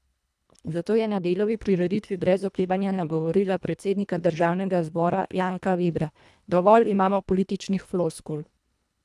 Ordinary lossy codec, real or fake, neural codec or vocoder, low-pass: none; fake; codec, 24 kHz, 1.5 kbps, HILCodec; none